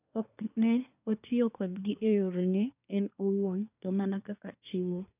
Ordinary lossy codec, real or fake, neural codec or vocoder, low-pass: none; fake; codec, 24 kHz, 1 kbps, SNAC; 3.6 kHz